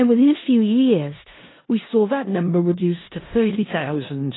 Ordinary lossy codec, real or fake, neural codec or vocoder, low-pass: AAC, 16 kbps; fake; codec, 16 kHz in and 24 kHz out, 0.4 kbps, LongCat-Audio-Codec, four codebook decoder; 7.2 kHz